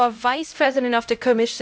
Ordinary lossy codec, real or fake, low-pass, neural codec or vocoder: none; fake; none; codec, 16 kHz, 0.5 kbps, X-Codec, HuBERT features, trained on LibriSpeech